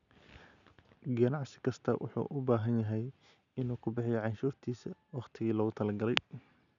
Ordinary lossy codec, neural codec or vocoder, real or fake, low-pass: none; none; real; 7.2 kHz